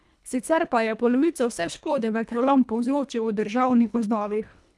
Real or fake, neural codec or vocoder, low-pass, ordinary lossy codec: fake; codec, 24 kHz, 1.5 kbps, HILCodec; none; none